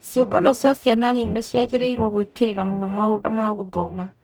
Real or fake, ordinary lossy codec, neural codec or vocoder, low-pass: fake; none; codec, 44.1 kHz, 0.9 kbps, DAC; none